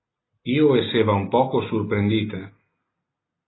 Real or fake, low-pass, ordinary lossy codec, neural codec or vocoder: real; 7.2 kHz; AAC, 16 kbps; none